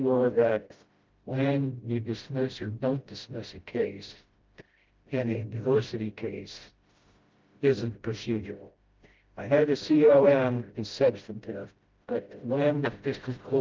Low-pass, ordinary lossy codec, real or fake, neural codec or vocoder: 7.2 kHz; Opus, 32 kbps; fake; codec, 16 kHz, 0.5 kbps, FreqCodec, smaller model